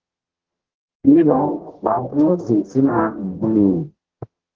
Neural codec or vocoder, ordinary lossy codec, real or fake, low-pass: codec, 44.1 kHz, 0.9 kbps, DAC; Opus, 16 kbps; fake; 7.2 kHz